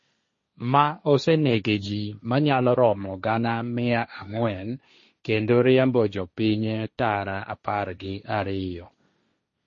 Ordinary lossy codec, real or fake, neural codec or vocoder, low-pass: MP3, 32 kbps; fake; codec, 16 kHz, 1.1 kbps, Voila-Tokenizer; 7.2 kHz